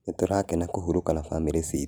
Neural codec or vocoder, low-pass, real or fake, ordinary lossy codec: none; none; real; none